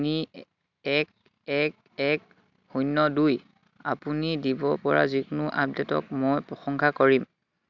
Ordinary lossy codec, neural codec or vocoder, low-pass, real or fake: none; none; 7.2 kHz; real